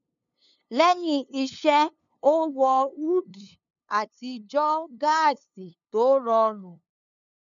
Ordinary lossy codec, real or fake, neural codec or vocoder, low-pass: none; fake; codec, 16 kHz, 2 kbps, FunCodec, trained on LibriTTS, 25 frames a second; 7.2 kHz